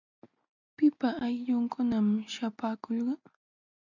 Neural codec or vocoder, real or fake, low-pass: none; real; 7.2 kHz